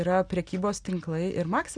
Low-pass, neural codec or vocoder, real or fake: 9.9 kHz; none; real